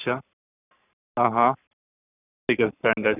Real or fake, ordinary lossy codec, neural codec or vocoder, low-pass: fake; none; codec, 44.1 kHz, 7.8 kbps, Pupu-Codec; 3.6 kHz